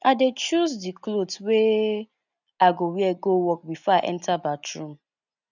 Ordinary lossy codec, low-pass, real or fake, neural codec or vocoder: none; 7.2 kHz; real; none